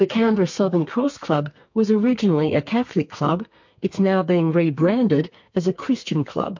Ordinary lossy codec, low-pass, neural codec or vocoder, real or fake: MP3, 48 kbps; 7.2 kHz; codec, 32 kHz, 1.9 kbps, SNAC; fake